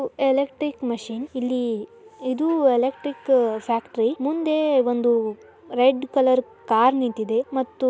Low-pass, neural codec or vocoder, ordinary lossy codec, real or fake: none; none; none; real